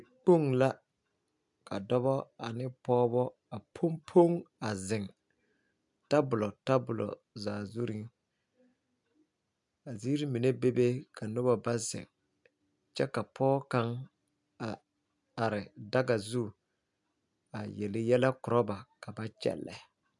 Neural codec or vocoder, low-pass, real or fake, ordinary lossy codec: none; 10.8 kHz; real; MP3, 96 kbps